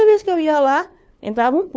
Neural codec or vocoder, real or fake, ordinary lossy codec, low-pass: codec, 16 kHz, 2 kbps, FunCodec, trained on LibriTTS, 25 frames a second; fake; none; none